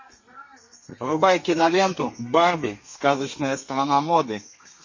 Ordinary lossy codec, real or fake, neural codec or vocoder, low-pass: MP3, 32 kbps; fake; codec, 32 kHz, 1.9 kbps, SNAC; 7.2 kHz